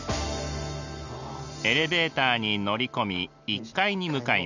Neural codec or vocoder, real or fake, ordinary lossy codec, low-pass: none; real; none; 7.2 kHz